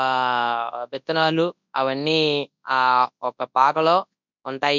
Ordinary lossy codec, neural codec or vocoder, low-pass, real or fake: none; codec, 24 kHz, 0.9 kbps, WavTokenizer, large speech release; 7.2 kHz; fake